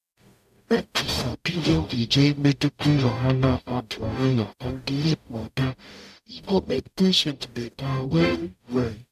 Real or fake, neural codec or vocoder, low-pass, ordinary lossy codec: fake; codec, 44.1 kHz, 0.9 kbps, DAC; 14.4 kHz; none